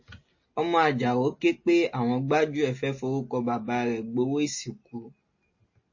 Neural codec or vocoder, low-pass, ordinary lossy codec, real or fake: none; 7.2 kHz; MP3, 32 kbps; real